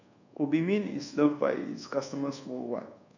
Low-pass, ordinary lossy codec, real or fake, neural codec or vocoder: 7.2 kHz; none; fake; codec, 24 kHz, 1.2 kbps, DualCodec